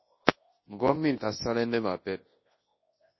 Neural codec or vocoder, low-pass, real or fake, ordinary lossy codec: codec, 24 kHz, 0.9 kbps, WavTokenizer, large speech release; 7.2 kHz; fake; MP3, 24 kbps